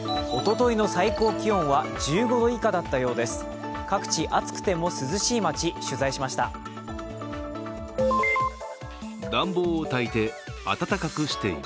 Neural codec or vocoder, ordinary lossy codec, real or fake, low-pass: none; none; real; none